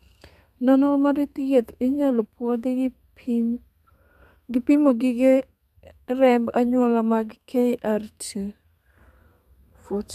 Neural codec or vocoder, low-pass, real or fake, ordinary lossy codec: codec, 32 kHz, 1.9 kbps, SNAC; 14.4 kHz; fake; none